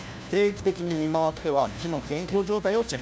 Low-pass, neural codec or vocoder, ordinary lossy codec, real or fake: none; codec, 16 kHz, 1 kbps, FunCodec, trained on LibriTTS, 50 frames a second; none; fake